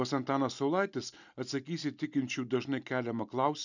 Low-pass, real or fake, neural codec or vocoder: 7.2 kHz; real; none